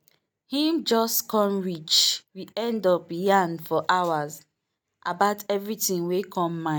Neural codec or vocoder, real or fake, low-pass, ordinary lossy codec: none; real; none; none